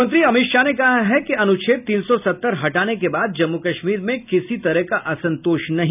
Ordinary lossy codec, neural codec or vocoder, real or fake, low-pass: none; none; real; 3.6 kHz